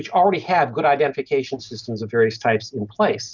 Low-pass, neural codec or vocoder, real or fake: 7.2 kHz; none; real